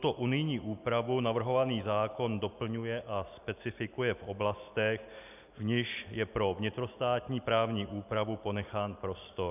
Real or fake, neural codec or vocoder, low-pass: real; none; 3.6 kHz